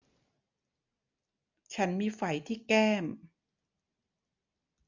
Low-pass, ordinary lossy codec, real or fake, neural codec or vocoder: 7.2 kHz; none; real; none